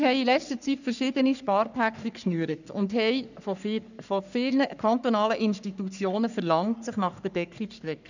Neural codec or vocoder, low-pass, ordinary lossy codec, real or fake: codec, 44.1 kHz, 3.4 kbps, Pupu-Codec; 7.2 kHz; none; fake